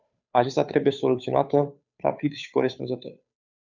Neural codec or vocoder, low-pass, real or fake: codec, 16 kHz, 2 kbps, FunCodec, trained on Chinese and English, 25 frames a second; 7.2 kHz; fake